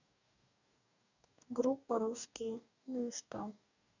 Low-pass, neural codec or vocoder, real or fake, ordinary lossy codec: 7.2 kHz; codec, 44.1 kHz, 2.6 kbps, DAC; fake; none